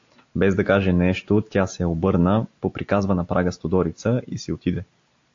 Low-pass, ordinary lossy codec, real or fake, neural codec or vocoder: 7.2 kHz; AAC, 48 kbps; real; none